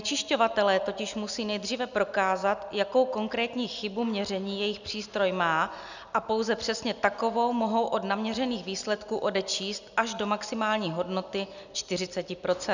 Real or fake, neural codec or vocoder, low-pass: real; none; 7.2 kHz